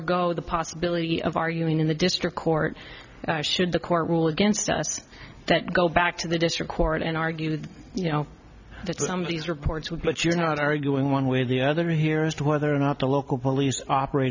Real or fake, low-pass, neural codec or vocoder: real; 7.2 kHz; none